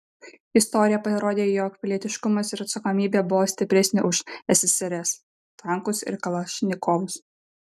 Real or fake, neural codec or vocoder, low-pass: real; none; 14.4 kHz